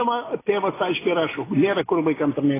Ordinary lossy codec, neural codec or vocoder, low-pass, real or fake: AAC, 16 kbps; none; 3.6 kHz; real